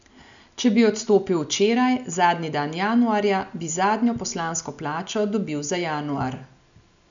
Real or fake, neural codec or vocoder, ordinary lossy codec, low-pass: real; none; none; 7.2 kHz